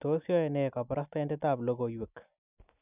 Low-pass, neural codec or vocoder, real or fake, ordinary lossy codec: 3.6 kHz; none; real; none